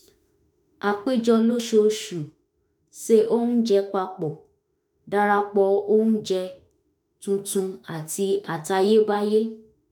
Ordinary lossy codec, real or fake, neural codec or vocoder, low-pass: none; fake; autoencoder, 48 kHz, 32 numbers a frame, DAC-VAE, trained on Japanese speech; none